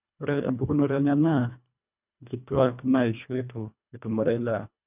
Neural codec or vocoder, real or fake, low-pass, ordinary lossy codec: codec, 24 kHz, 1.5 kbps, HILCodec; fake; 3.6 kHz; none